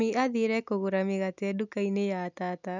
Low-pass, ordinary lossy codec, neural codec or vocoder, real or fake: 7.2 kHz; none; none; real